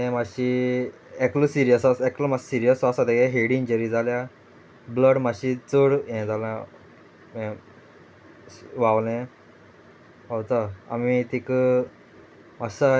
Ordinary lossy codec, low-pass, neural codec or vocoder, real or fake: none; none; none; real